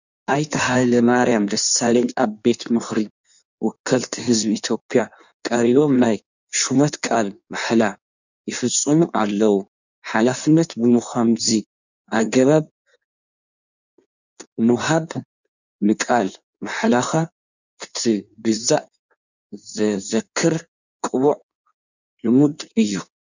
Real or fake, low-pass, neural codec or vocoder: fake; 7.2 kHz; codec, 16 kHz in and 24 kHz out, 1.1 kbps, FireRedTTS-2 codec